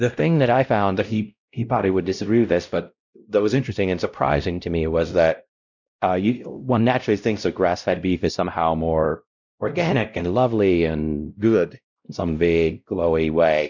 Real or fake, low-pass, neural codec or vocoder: fake; 7.2 kHz; codec, 16 kHz, 0.5 kbps, X-Codec, WavLM features, trained on Multilingual LibriSpeech